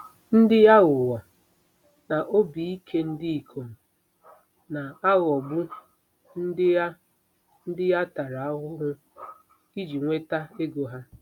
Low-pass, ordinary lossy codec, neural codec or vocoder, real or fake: 19.8 kHz; none; none; real